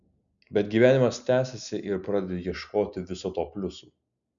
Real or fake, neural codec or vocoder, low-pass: real; none; 7.2 kHz